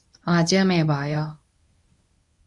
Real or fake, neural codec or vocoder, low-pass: fake; codec, 24 kHz, 0.9 kbps, WavTokenizer, medium speech release version 1; 10.8 kHz